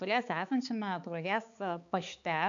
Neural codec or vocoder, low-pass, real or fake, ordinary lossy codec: codec, 16 kHz, 4 kbps, X-Codec, HuBERT features, trained on balanced general audio; 7.2 kHz; fake; MP3, 64 kbps